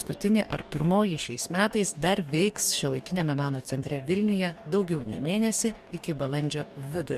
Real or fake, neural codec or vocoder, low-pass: fake; codec, 44.1 kHz, 2.6 kbps, DAC; 14.4 kHz